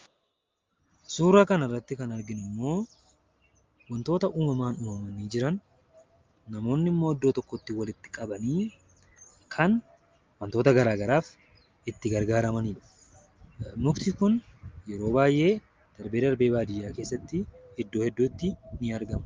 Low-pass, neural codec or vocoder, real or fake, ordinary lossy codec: 7.2 kHz; none; real; Opus, 32 kbps